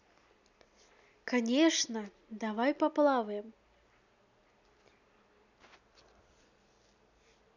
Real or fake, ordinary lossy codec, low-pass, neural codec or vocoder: real; none; 7.2 kHz; none